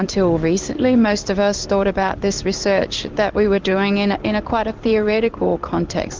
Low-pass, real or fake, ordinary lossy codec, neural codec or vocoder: 7.2 kHz; fake; Opus, 32 kbps; codec, 16 kHz in and 24 kHz out, 1 kbps, XY-Tokenizer